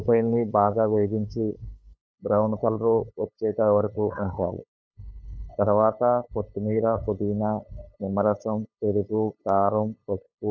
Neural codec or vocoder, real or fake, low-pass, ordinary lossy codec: codec, 16 kHz, 8 kbps, FunCodec, trained on LibriTTS, 25 frames a second; fake; none; none